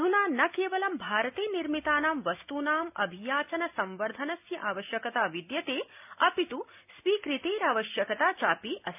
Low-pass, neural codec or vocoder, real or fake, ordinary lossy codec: 3.6 kHz; none; real; none